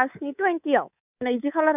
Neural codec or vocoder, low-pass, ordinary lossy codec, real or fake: codec, 24 kHz, 3.1 kbps, DualCodec; 3.6 kHz; none; fake